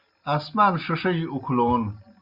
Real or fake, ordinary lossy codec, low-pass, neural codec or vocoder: real; Opus, 64 kbps; 5.4 kHz; none